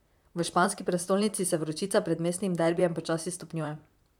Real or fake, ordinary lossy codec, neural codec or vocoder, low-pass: fake; none; vocoder, 44.1 kHz, 128 mel bands, Pupu-Vocoder; 19.8 kHz